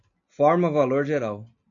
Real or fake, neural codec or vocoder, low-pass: real; none; 7.2 kHz